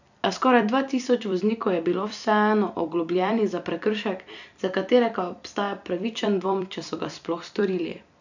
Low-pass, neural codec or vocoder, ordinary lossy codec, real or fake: 7.2 kHz; vocoder, 44.1 kHz, 128 mel bands every 256 samples, BigVGAN v2; none; fake